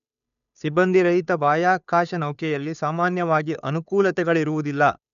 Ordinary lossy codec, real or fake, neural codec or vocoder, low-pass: none; fake; codec, 16 kHz, 2 kbps, FunCodec, trained on Chinese and English, 25 frames a second; 7.2 kHz